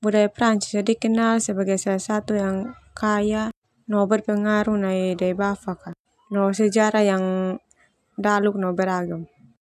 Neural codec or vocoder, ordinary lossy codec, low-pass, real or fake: none; AAC, 96 kbps; 14.4 kHz; real